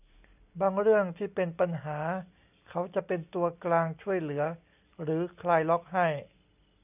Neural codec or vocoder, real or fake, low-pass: none; real; 3.6 kHz